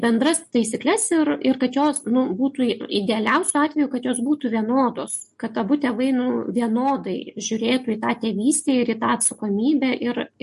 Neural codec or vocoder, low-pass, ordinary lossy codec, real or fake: none; 14.4 kHz; MP3, 48 kbps; real